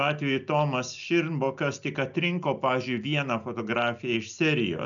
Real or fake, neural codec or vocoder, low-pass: real; none; 7.2 kHz